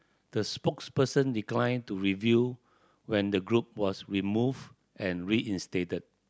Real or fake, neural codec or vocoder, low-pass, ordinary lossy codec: real; none; none; none